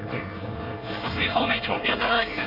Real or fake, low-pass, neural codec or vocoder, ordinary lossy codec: fake; 5.4 kHz; codec, 24 kHz, 1 kbps, SNAC; none